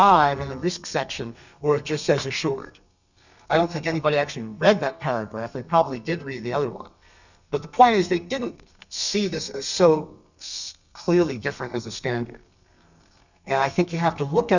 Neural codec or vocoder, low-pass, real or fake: codec, 32 kHz, 1.9 kbps, SNAC; 7.2 kHz; fake